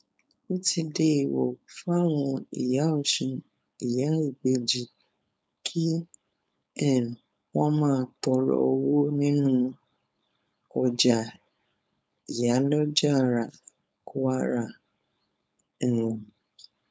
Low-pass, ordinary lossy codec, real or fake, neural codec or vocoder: none; none; fake; codec, 16 kHz, 4.8 kbps, FACodec